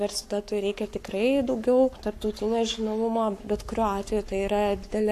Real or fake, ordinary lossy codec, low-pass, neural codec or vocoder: fake; AAC, 64 kbps; 14.4 kHz; codec, 44.1 kHz, 7.8 kbps, Pupu-Codec